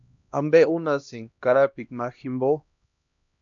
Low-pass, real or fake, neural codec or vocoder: 7.2 kHz; fake; codec, 16 kHz, 1 kbps, X-Codec, HuBERT features, trained on LibriSpeech